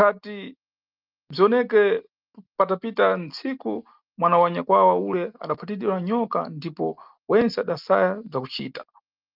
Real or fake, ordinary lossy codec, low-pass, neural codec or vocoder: real; Opus, 24 kbps; 5.4 kHz; none